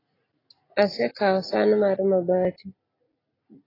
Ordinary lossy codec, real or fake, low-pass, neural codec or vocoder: AAC, 24 kbps; real; 5.4 kHz; none